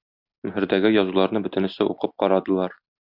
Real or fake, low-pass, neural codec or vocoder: real; 5.4 kHz; none